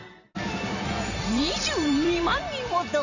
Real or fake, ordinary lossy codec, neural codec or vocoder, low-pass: fake; none; vocoder, 44.1 kHz, 128 mel bands every 512 samples, BigVGAN v2; 7.2 kHz